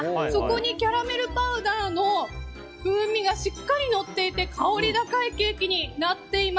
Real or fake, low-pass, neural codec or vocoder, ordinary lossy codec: real; none; none; none